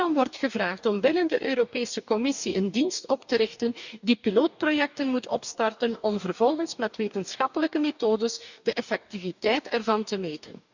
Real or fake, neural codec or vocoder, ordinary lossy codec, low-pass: fake; codec, 44.1 kHz, 2.6 kbps, DAC; none; 7.2 kHz